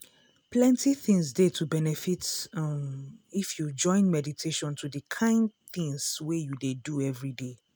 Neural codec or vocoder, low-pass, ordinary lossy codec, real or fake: none; none; none; real